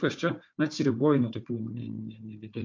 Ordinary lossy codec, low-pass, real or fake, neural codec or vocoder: MP3, 48 kbps; 7.2 kHz; fake; codec, 16 kHz, 4 kbps, FunCodec, trained on Chinese and English, 50 frames a second